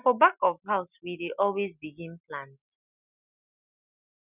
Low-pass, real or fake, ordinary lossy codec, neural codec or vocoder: 3.6 kHz; real; none; none